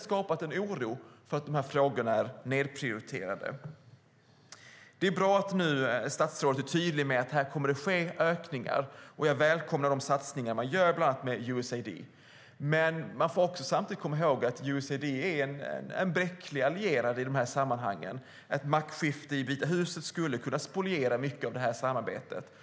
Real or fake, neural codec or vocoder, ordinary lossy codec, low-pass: real; none; none; none